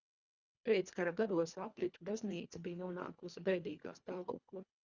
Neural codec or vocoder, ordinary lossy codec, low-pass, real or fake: codec, 24 kHz, 1.5 kbps, HILCodec; Opus, 64 kbps; 7.2 kHz; fake